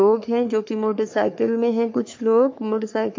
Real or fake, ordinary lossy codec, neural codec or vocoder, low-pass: fake; MP3, 48 kbps; codec, 44.1 kHz, 3.4 kbps, Pupu-Codec; 7.2 kHz